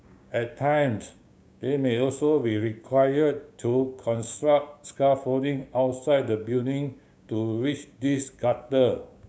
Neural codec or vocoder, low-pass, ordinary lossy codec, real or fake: codec, 16 kHz, 6 kbps, DAC; none; none; fake